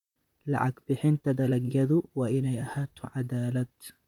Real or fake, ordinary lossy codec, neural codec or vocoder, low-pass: fake; none; vocoder, 44.1 kHz, 128 mel bands, Pupu-Vocoder; 19.8 kHz